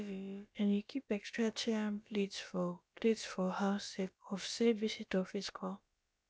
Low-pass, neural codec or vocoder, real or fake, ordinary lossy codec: none; codec, 16 kHz, about 1 kbps, DyCAST, with the encoder's durations; fake; none